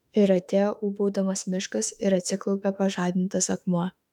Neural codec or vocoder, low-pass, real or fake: autoencoder, 48 kHz, 32 numbers a frame, DAC-VAE, trained on Japanese speech; 19.8 kHz; fake